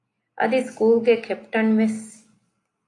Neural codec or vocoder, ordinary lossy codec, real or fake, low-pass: none; AAC, 48 kbps; real; 10.8 kHz